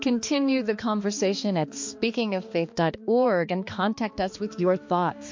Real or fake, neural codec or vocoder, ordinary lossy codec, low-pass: fake; codec, 16 kHz, 2 kbps, X-Codec, HuBERT features, trained on balanced general audio; MP3, 48 kbps; 7.2 kHz